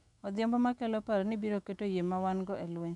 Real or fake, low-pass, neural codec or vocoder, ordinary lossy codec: fake; 10.8 kHz; autoencoder, 48 kHz, 128 numbers a frame, DAC-VAE, trained on Japanese speech; none